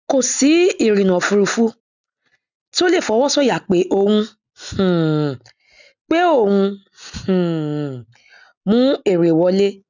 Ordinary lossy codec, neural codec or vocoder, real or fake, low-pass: none; none; real; 7.2 kHz